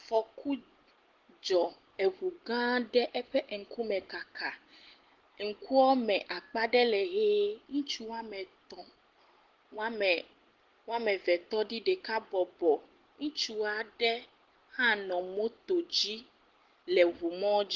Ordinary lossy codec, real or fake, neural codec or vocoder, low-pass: Opus, 16 kbps; real; none; 7.2 kHz